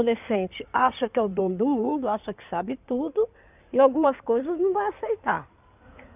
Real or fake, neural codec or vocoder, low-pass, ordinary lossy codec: fake; codec, 16 kHz in and 24 kHz out, 2.2 kbps, FireRedTTS-2 codec; 3.6 kHz; none